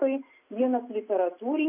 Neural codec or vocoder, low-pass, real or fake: none; 3.6 kHz; real